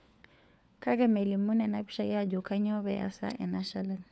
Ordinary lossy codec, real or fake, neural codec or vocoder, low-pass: none; fake; codec, 16 kHz, 4 kbps, FunCodec, trained on LibriTTS, 50 frames a second; none